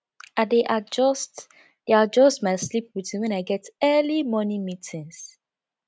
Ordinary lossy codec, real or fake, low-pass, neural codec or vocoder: none; real; none; none